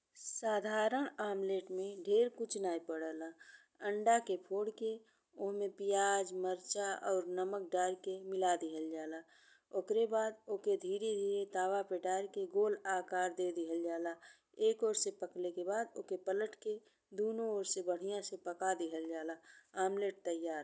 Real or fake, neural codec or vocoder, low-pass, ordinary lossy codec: real; none; none; none